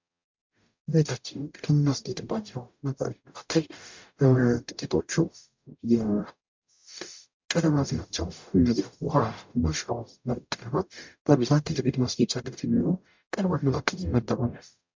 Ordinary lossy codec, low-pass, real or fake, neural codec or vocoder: MP3, 64 kbps; 7.2 kHz; fake; codec, 44.1 kHz, 0.9 kbps, DAC